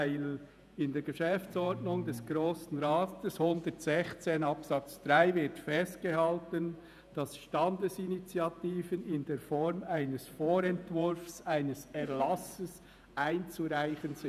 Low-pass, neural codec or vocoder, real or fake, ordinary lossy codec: 14.4 kHz; vocoder, 48 kHz, 128 mel bands, Vocos; fake; none